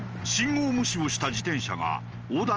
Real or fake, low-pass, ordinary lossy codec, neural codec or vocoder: real; 7.2 kHz; Opus, 24 kbps; none